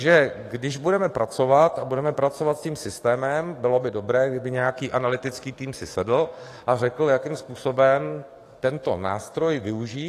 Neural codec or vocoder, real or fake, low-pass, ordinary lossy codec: codec, 44.1 kHz, 7.8 kbps, DAC; fake; 14.4 kHz; AAC, 48 kbps